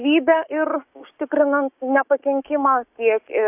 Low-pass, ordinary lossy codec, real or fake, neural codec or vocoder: 3.6 kHz; AAC, 32 kbps; fake; codec, 16 kHz, 6 kbps, DAC